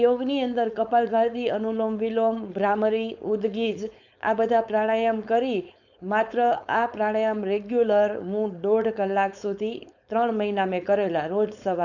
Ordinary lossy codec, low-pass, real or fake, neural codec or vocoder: none; 7.2 kHz; fake; codec, 16 kHz, 4.8 kbps, FACodec